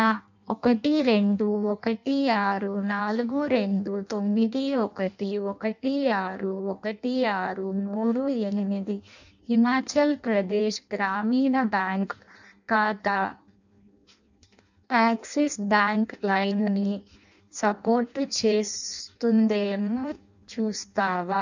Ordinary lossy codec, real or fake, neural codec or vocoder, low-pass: none; fake; codec, 16 kHz in and 24 kHz out, 0.6 kbps, FireRedTTS-2 codec; 7.2 kHz